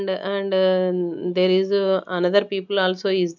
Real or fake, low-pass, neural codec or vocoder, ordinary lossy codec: real; 7.2 kHz; none; none